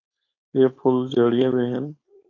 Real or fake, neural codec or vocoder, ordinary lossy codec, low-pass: fake; codec, 16 kHz, 4.8 kbps, FACodec; AAC, 32 kbps; 7.2 kHz